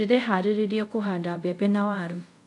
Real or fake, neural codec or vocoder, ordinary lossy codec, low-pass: fake; codec, 24 kHz, 0.5 kbps, DualCodec; none; 10.8 kHz